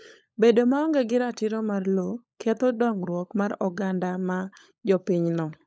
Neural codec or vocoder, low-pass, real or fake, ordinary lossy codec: codec, 16 kHz, 16 kbps, FunCodec, trained on LibriTTS, 50 frames a second; none; fake; none